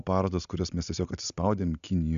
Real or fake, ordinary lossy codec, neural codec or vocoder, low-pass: real; MP3, 96 kbps; none; 7.2 kHz